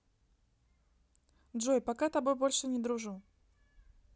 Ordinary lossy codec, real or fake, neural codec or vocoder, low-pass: none; real; none; none